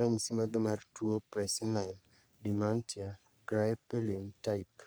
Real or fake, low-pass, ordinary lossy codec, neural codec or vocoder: fake; none; none; codec, 44.1 kHz, 3.4 kbps, Pupu-Codec